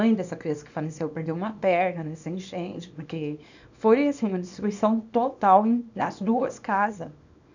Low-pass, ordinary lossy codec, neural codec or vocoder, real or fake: 7.2 kHz; none; codec, 24 kHz, 0.9 kbps, WavTokenizer, small release; fake